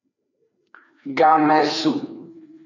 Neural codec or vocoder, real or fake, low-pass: codec, 16 kHz, 2 kbps, FreqCodec, larger model; fake; 7.2 kHz